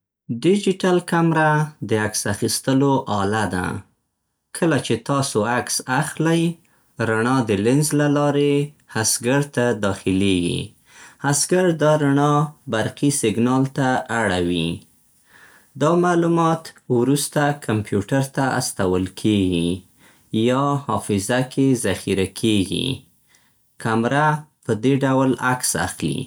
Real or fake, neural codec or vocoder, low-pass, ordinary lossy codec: real; none; none; none